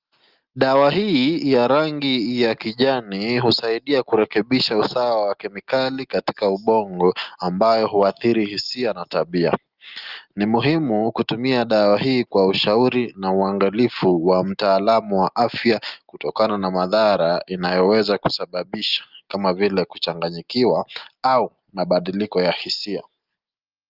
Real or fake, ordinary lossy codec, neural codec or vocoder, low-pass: real; Opus, 24 kbps; none; 5.4 kHz